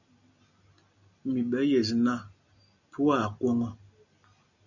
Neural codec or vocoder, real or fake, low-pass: none; real; 7.2 kHz